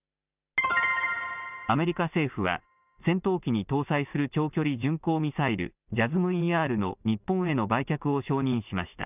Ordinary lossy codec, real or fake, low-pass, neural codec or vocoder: none; real; 3.6 kHz; none